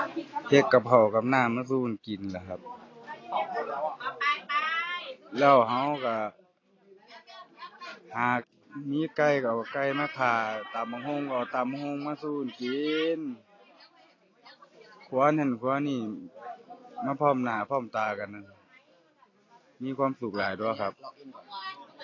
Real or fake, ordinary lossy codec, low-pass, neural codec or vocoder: real; AAC, 32 kbps; 7.2 kHz; none